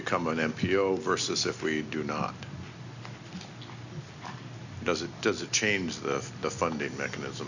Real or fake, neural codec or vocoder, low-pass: real; none; 7.2 kHz